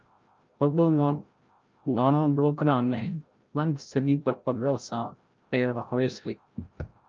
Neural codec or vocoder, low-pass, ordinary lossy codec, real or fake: codec, 16 kHz, 0.5 kbps, FreqCodec, larger model; 7.2 kHz; Opus, 24 kbps; fake